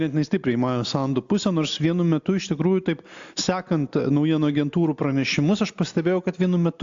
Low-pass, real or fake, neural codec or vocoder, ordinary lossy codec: 7.2 kHz; real; none; AAC, 64 kbps